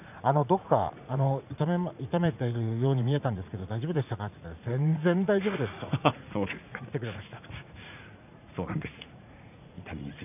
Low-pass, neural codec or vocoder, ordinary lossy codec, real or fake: 3.6 kHz; vocoder, 44.1 kHz, 80 mel bands, Vocos; none; fake